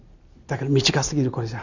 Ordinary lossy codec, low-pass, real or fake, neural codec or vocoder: none; 7.2 kHz; real; none